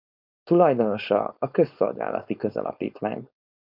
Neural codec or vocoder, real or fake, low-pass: codec, 16 kHz, 4.8 kbps, FACodec; fake; 5.4 kHz